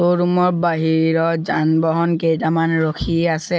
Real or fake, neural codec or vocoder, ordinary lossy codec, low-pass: real; none; none; none